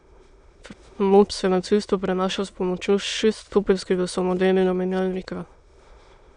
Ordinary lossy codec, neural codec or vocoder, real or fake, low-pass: none; autoencoder, 22.05 kHz, a latent of 192 numbers a frame, VITS, trained on many speakers; fake; 9.9 kHz